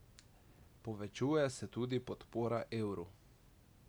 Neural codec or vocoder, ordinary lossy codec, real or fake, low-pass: none; none; real; none